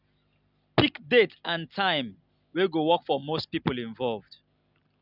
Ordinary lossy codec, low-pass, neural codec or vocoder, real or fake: none; 5.4 kHz; none; real